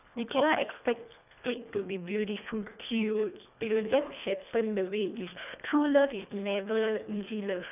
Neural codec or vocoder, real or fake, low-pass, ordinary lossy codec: codec, 24 kHz, 1.5 kbps, HILCodec; fake; 3.6 kHz; none